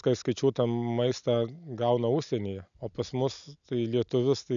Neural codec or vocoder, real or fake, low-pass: none; real; 7.2 kHz